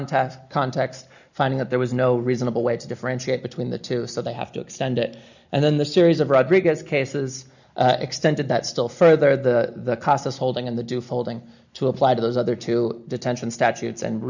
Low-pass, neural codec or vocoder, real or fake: 7.2 kHz; none; real